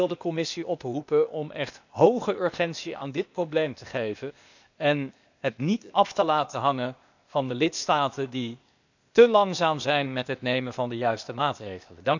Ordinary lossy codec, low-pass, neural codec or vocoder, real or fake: none; 7.2 kHz; codec, 16 kHz, 0.8 kbps, ZipCodec; fake